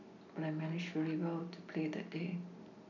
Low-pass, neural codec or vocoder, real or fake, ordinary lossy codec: 7.2 kHz; none; real; none